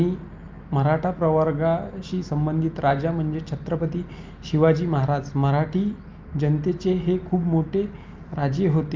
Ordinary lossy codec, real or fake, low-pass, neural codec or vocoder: Opus, 24 kbps; real; 7.2 kHz; none